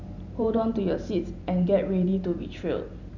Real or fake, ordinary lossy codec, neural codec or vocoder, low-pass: fake; none; vocoder, 44.1 kHz, 128 mel bands every 512 samples, BigVGAN v2; 7.2 kHz